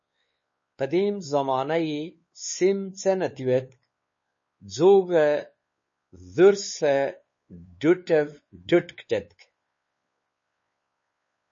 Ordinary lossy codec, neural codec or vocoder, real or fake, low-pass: MP3, 32 kbps; codec, 16 kHz, 4 kbps, X-Codec, WavLM features, trained on Multilingual LibriSpeech; fake; 7.2 kHz